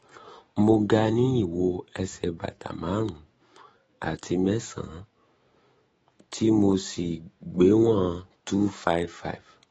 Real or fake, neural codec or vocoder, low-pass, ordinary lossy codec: fake; autoencoder, 48 kHz, 128 numbers a frame, DAC-VAE, trained on Japanese speech; 19.8 kHz; AAC, 24 kbps